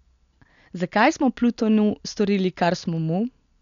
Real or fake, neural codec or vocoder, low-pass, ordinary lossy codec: real; none; 7.2 kHz; none